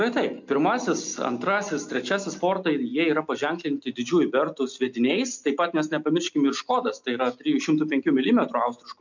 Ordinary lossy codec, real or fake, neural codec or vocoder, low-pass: MP3, 64 kbps; real; none; 7.2 kHz